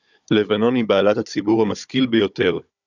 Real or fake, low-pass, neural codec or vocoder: fake; 7.2 kHz; codec, 16 kHz, 16 kbps, FunCodec, trained on Chinese and English, 50 frames a second